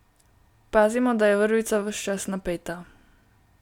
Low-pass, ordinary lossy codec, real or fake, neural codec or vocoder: 19.8 kHz; none; real; none